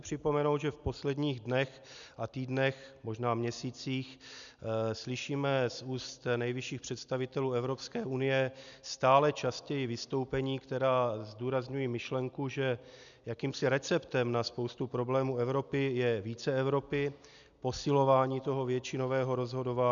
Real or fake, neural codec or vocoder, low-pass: real; none; 7.2 kHz